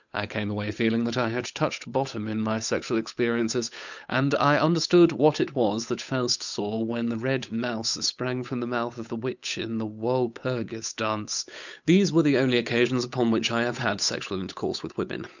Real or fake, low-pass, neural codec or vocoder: fake; 7.2 kHz; codec, 16 kHz, 8 kbps, FunCodec, trained on Chinese and English, 25 frames a second